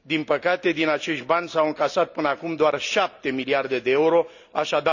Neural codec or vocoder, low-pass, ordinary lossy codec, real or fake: none; 7.2 kHz; none; real